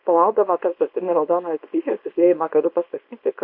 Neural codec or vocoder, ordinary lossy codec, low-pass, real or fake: codec, 24 kHz, 0.9 kbps, WavTokenizer, small release; MP3, 48 kbps; 5.4 kHz; fake